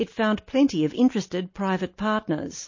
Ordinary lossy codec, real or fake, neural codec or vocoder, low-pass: MP3, 32 kbps; real; none; 7.2 kHz